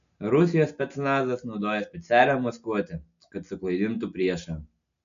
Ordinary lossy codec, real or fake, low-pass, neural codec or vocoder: AAC, 96 kbps; real; 7.2 kHz; none